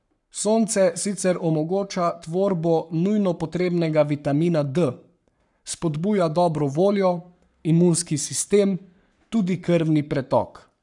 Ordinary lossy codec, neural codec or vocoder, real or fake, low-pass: none; codec, 44.1 kHz, 7.8 kbps, Pupu-Codec; fake; 10.8 kHz